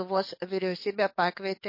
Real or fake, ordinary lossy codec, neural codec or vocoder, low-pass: fake; MP3, 32 kbps; codec, 44.1 kHz, 7.8 kbps, DAC; 5.4 kHz